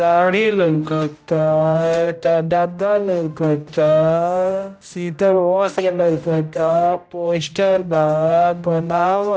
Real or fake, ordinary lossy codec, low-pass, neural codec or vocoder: fake; none; none; codec, 16 kHz, 0.5 kbps, X-Codec, HuBERT features, trained on general audio